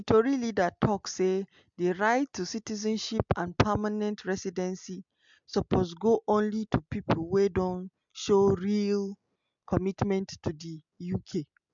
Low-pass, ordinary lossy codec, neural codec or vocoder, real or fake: 7.2 kHz; MP3, 64 kbps; none; real